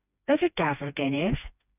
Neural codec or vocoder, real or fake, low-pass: codec, 16 kHz, 2 kbps, FreqCodec, smaller model; fake; 3.6 kHz